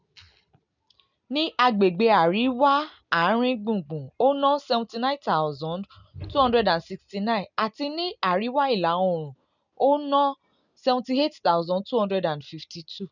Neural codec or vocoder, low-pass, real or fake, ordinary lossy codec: none; 7.2 kHz; real; none